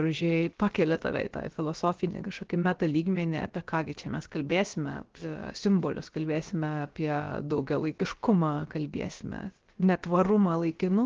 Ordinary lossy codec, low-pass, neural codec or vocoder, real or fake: Opus, 16 kbps; 7.2 kHz; codec, 16 kHz, about 1 kbps, DyCAST, with the encoder's durations; fake